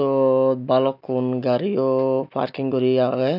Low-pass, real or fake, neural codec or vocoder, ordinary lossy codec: 5.4 kHz; real; none; none